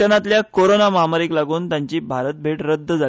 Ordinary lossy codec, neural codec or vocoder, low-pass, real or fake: none; none; none; real